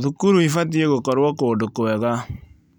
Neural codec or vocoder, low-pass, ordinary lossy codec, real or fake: none; 19.8 kHz; none; real